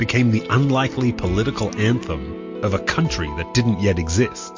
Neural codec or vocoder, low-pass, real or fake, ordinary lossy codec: none; 7.2 kHz; real; MP3, 48 kbps